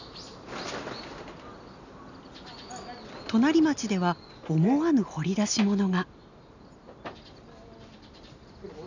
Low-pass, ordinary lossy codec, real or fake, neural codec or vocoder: 7.2 kHz; none; real; none